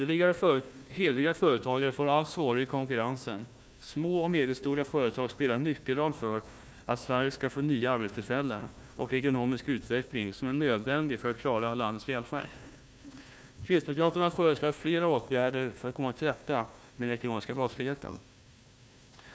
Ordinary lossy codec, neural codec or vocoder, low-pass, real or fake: none; codec, 16 kHz, 1 kbps, FunCodec, trained on Chinese and English, 50 frames a second; none; fake